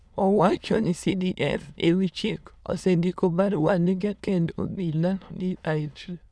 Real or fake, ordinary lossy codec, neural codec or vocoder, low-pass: fake; none; autoencoder, 22.05 kHz, a latent of 192 numbers a frame, VITS, trained on many speakers; none